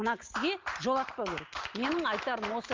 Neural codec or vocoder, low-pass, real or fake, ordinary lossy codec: none; 7.2 kHz; real; Opus, 32 kbps